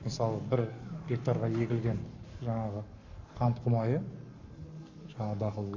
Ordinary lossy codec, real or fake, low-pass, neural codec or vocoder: MP3, 48 kbps; fake; 7.2 kHz; codec, 44.1 kHz, 7.8 kbps, Pupu-Codec